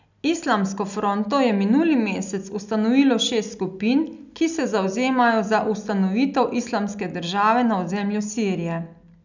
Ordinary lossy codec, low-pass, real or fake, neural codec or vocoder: none; 7.2 kHz; real; none